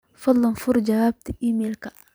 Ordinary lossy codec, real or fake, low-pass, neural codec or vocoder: none; fake; none; vocoder, 44.1 kHz, 128 mel bands every 256 samples, BigVGAN v2